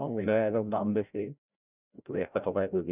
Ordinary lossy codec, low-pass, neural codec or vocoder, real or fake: none; 3.6 kHz; codec, 16 kHz, 0.5 kbps, FreqCodec, larger model; fake